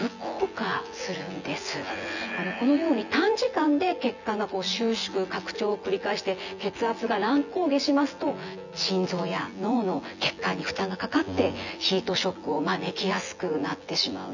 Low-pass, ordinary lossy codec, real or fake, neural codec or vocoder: 7.2 kHz; none; fake; vocoder, 24 kHz, 100 mel bands, Vocos